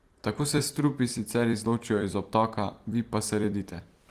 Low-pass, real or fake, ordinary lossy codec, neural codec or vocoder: 14.4 kHz; fake; Opus, 24 kbps; vocoder, 44.1 kHz, 128 mel bands every 256 samples, BigVGAN v2